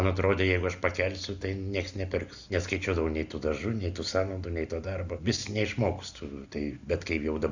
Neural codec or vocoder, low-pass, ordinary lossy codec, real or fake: none; 7.2 kHz; Opus, 64 kbps; real